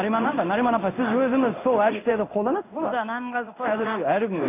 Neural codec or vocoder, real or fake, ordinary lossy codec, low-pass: codec, 16 kHz in and 24 kHz out, 1 kbps, XY-Tokenizer; fake; AAC, 24 kbps; 3.6 kHz